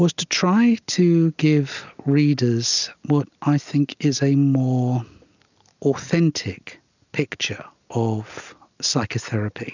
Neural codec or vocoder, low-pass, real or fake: none; 7.2 kHz; real